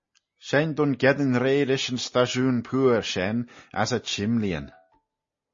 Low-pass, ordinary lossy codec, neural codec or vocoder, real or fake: 7.2 kHz; MP3, 32 kbps; none; real